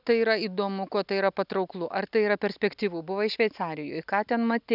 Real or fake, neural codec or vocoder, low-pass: real; none; 5.4 kHz